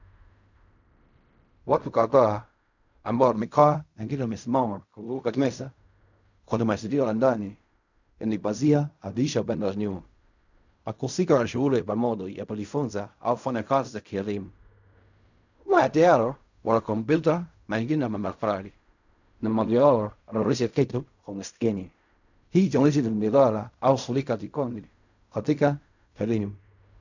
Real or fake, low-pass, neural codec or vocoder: fake; 7.2 kHz; codec, 16 kHz in and 24 kHz out, 0.4 kbps, LongCat-Audio-Codec, fine tuned four codebook decoder